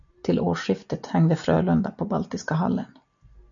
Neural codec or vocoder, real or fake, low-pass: none; real; 7.2 kHz